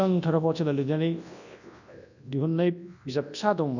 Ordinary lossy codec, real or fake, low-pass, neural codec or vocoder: none; fake; 7.2 kHz; codec, 24 kHz, 0.9 kbps, WavTokenizer, large speech release